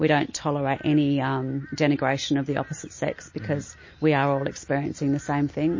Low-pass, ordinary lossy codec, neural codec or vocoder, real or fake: 7.2 kHz; MP3, 32 kbps; none; real